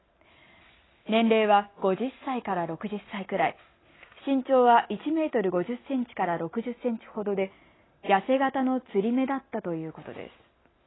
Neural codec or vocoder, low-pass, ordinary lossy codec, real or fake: none; 7.2 kHz; AAC, 16 kbps; real